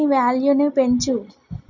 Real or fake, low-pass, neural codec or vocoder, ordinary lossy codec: real; 7.2 kHz; none; none